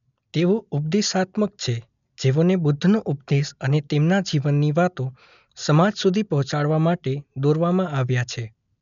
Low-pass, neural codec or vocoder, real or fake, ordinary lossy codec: 7.2 kHz; none; real; MP3, 96 kbps